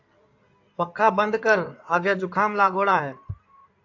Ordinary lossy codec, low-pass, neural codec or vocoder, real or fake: AAC, 48 kbps; 7.2 kHz; codec, 16 kHz in and 24 kHz out, 2.2 kbps, FireRedTTS-2 codec; fake